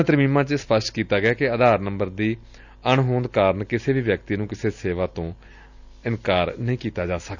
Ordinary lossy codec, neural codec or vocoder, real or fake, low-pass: none; none; real; 7.2 kHz